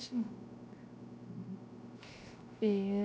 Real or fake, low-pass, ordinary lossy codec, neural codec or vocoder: fake; none; none; codec, 16 kHz, 0.3 kbps, FocalCodec